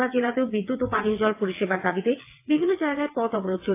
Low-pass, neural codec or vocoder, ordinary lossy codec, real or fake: 3.6 kHz; vocoder, 22.05 kHz, 80 mel bands, WaveNeXt; AAC, 24 kbps; fake